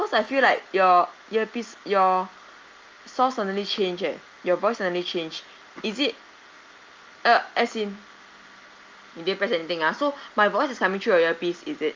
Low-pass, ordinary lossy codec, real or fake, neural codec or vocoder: 7.2 kHz; Opus, 24 kbps; real; none